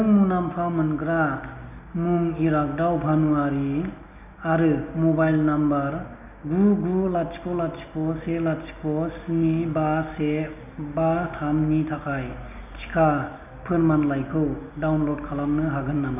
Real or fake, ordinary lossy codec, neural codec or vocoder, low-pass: real; none; none; 3.6 kHz